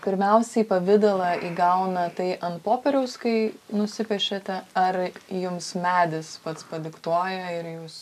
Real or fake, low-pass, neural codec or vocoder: real; 14.4 kHz; none